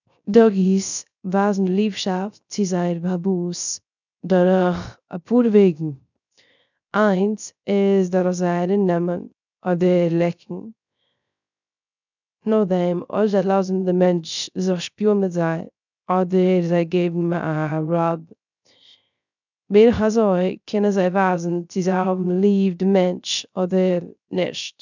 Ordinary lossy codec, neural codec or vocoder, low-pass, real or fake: none; codec, 16 kHz, 0.3 kbps, FocalCodec; 7.2 kHz; fake